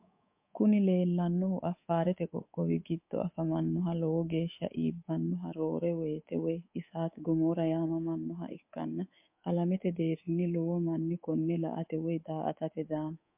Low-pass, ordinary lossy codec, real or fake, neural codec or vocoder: 3.6 kHz; MP3, 32 kbps; fake; codec, 24 kHz, 3.1 kbps, DualCodec